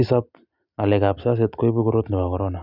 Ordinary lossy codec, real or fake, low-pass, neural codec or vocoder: none; real; 5.4 kHz; none